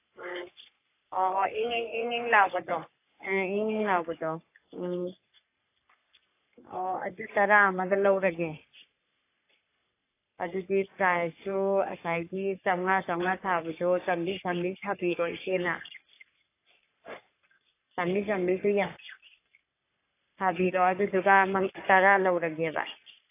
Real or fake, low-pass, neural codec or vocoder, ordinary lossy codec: fake; 3.6 kHz; codec, 44.1 kHz, 3.4 kbps, Pupu-Codec; AAC, 24 kbps